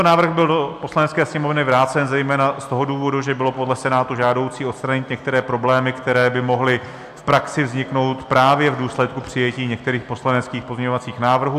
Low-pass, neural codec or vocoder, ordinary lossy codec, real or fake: 14.4 kHz; none; AAC, 96 kbps; real